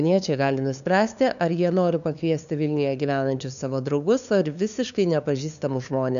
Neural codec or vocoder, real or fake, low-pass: codec, 16 kHz, 2 kbps, FunCodec, trained on LibriTTS, 25 frames a second; fake; 7.2 kHz